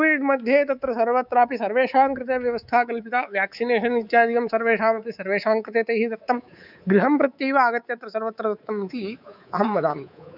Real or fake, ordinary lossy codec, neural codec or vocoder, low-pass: fake; none; codec, 24 kHz, 3.1 kbps, DualCodec; 5.4 kHz